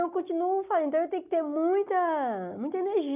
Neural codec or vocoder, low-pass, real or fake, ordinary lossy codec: none; 3.6 kHz; real; none